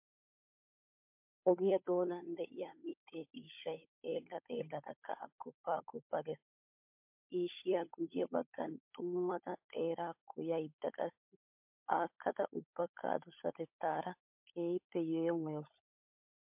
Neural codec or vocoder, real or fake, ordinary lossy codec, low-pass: codec, 16 kHz, 16 kbps, FunCodec, trained on LibriTTS, 50 frames a second; fake; MP3, 32 kbps; 3.6 kHz